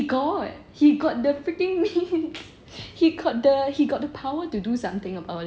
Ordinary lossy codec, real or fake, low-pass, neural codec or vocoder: none; real; none; none